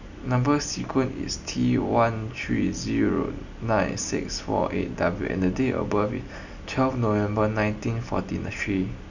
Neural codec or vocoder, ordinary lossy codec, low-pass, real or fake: none; none; 7.2 kHz; real